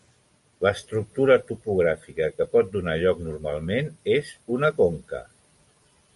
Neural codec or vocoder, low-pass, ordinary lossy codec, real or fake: none; 14.4 kHz; MP3, 48 kbps; real